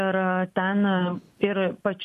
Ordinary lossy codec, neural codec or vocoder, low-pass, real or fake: MP3, 64 kbps; none; 14.4 kHz; real